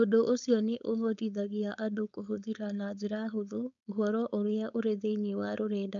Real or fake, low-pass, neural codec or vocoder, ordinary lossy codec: fake; 7.2 kHz; codec, 16 kHz, 4.8 kbps, FACodec; none